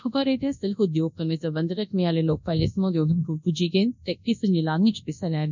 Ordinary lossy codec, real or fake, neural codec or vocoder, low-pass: none; fake; codec, 24 kHz, 0.9 kbps, WavTokenizer, large speech release; 7.2 kHz